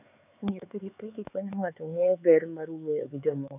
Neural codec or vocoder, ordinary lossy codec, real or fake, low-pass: codec, 16 kHz, 4 kbps, X-Codec, HuBERT features, trained on LibriSpeech; none; fake; 3.6 kHz